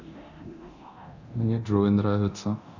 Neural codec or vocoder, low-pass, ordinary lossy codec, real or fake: codec, 24 kHz, 0.9 kbps, DualCodec; 7.2 kHz; none; fake